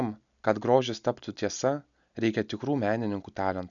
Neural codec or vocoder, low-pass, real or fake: none; 7.2 kHz; real